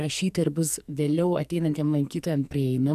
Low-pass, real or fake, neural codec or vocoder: 14.4 kHz; fake; codec, 44.1 kHz, 2.6 kbps, SNAC